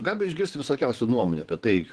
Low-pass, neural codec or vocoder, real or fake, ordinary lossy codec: 9.9 kHz; vocoder, 22.05 kHz, 80 mel bands, WaveNeXt; fake; Opus, 16 kbps